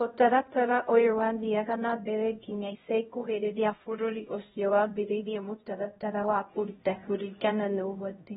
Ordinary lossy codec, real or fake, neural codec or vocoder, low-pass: AAC, 16 kbps; fake; codec, 16 kHz, 0.5 kbps, X-Codec, HuBERT features, trained on LibriSpeech; 7.2 kHz